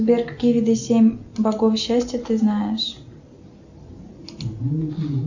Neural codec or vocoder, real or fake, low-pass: none; real; 7.2 kHz